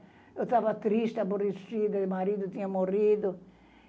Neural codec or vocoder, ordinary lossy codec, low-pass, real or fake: none; none; none; real